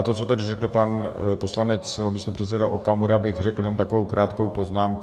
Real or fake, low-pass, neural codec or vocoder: fake; 14.4 kHz; codec, 44.1 kHz, 2.6 kbps, DAC